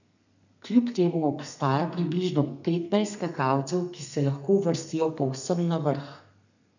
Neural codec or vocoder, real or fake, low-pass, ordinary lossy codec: codec, 32 kHz, 1.9 kbps, SNAC; fake; 7.2 kHz; none